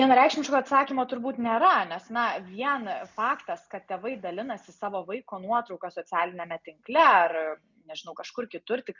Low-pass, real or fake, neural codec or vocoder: 7.2 kHz; real; none